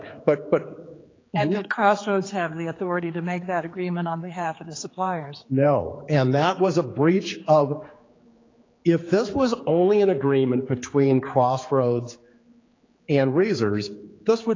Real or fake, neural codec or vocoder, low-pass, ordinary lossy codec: fake; codec, 16 kHz, 4 kbps, X-Codec, HuBERT features, trained on general audio; 7.2 kHz; AAC, 32 kbps